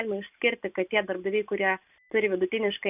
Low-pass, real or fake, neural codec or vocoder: 3.6 kHz; real; none